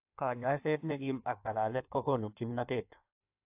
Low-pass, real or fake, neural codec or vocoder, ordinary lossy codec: 3.6 kHz; fake; codec, 16 kHz in and 24 kHz out, 1.1 kbps, FireRedTTS-2 codec; AAC, 32 kbps